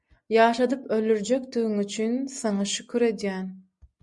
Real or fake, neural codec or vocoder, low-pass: real; none; 10.8 kHz